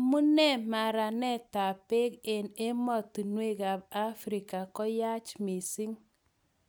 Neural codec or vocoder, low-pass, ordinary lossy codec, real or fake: none; none; none; real